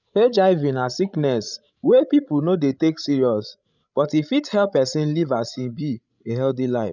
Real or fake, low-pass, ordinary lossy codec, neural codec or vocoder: fake; 7.2 kHz; none; codec, 16 kHz, 16 kbps, FreqCodec, larger model